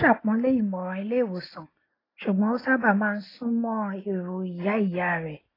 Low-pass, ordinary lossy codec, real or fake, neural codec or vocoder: 5.4 kHz; AAC, 24 kbps; real; none